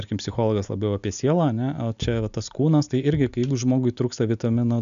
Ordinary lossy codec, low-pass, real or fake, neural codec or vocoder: MP3, 96 kbps; 7.2 kHz; real; none